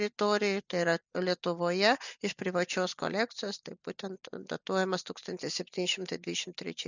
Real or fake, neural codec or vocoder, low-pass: real; none; 7.2 kHz